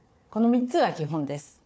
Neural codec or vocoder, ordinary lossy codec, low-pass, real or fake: codec, 16 kHz, 4 kbps, FunCodec, trained on Chinese and English, 50 frames a second; none; none; fake